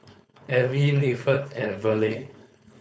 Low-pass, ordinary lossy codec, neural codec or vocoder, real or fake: none; none; codec, 16 kHz, 4.8 kbps, FACodec; fake